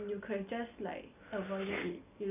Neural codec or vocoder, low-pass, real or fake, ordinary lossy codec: none; 3.6 kHz; real; none